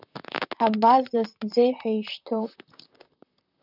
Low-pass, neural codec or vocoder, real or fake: 5.4 kHz; vocoder, 44.1 kHz, 128 mel bands, Pupu-Vocoder; fake